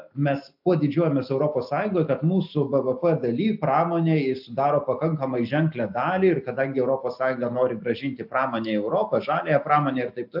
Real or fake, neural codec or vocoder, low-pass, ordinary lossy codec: real; none; 5.4 kHz; AAC, 48 kbps